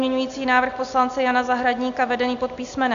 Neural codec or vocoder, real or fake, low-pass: none; real; 7.2 kHz